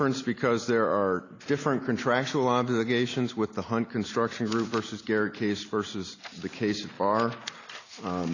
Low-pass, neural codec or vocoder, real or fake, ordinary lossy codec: 7.2 kHz; none; real; AAC, 32 kbps